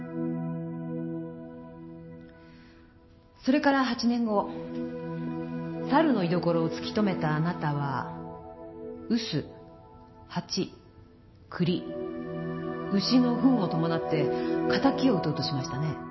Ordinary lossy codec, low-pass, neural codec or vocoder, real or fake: MP3, 24 kbps; 7.2 kHz; none; real